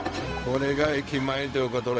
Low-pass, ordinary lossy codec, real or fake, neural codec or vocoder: none; none; fake; codec, 16 kHz, 0.4 kbps, LongCat-Audio-Codec